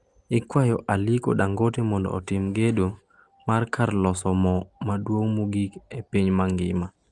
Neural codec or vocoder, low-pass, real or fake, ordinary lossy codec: none; 10.8 kHz; real; Opus, 24 kbps